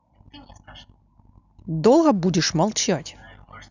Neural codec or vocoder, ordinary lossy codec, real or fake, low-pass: none; none; real; 7.2 kHz